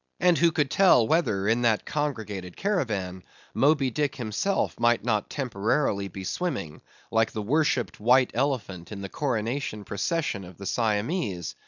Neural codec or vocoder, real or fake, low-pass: none; real; 7.2 kHz